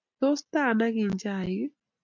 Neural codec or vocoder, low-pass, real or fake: none; 7.2 kHz; real